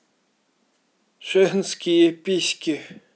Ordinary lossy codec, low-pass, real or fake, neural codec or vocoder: none; none; real; none